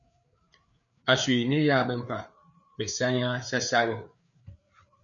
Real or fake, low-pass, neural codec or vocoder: fake; 7.2 kHz; codec, 16 kHz, 4 kbps, FreqCodec, larger model